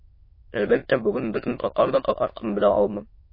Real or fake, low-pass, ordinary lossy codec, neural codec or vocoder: fake; 5.4 kHz; MP3, 24 kbps; autoencoder, 22.05 kHz, a latent of 192 numbers a frame, VITS, trained on many speakers